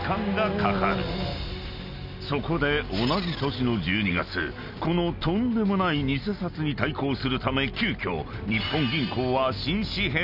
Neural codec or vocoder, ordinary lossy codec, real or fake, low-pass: none; none; real; 5.4 kHz